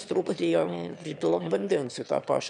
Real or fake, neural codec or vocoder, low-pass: fake; autoencoder, 22.05 kHz, a latent of 192 numbers a frame, VITS, trained on one speaker; 9.9 kHz